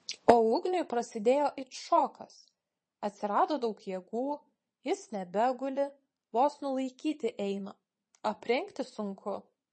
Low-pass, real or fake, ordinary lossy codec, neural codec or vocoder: 9.9 kHz; fake; MP3, 32 kbps; vocoder, 22.05 kHz, 80 mel bands, Vocos